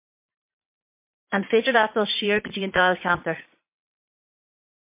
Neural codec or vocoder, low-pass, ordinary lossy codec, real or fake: vocoder, 22.05 kHz, 80 mel bands, Vocos; 3.6 kHz; MP3, 24 kbps; fake